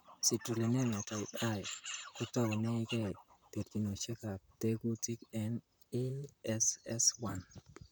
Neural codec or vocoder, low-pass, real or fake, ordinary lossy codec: vocoder, 44.1 kHz, 128 mel bands, Pupu-Vocoder; none; fake; none